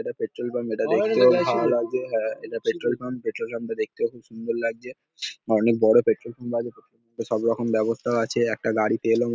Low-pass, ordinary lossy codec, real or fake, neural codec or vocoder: none; none; real; none